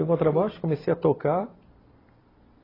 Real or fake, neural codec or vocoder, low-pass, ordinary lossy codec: fake; vocoder, 44.1 kHz, 128 mel bands every 256 samples, BigVGAN v2; 5.4 kHz; AAC, 24 kbps